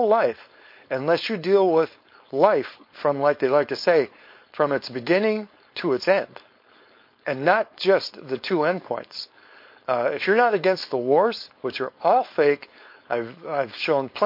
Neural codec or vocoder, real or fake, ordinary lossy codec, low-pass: codec, 16 kHz, 4.8 kbps, FACodec; fake; MP3, 32 kbps; 5.4 kHz